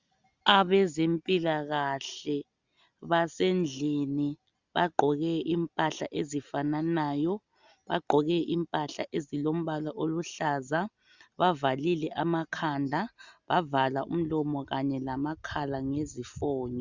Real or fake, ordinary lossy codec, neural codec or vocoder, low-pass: real; Opus, 64 kbps; none; 7.2 kHz